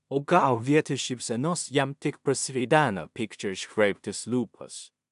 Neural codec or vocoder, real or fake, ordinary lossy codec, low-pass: codec, 16 kHz in and 24 kHz out, 0.4 kbps, LongCat-Audio-Codec, two codebook decoder; fake; AAC, 96 kbps; 10.8 kHz